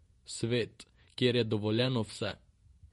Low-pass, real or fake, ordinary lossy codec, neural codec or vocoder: 19.8 kHz; fake; MP3, 48 kbps; vocoder, 48 kHz, 128 mel bands, Vocos